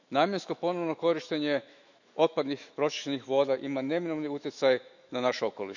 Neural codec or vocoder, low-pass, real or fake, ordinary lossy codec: autoencoder, 48 kHz, 128 numbers a frame, DAC-VAE, trained on Japanese speech; 7.2 kHz; fake; none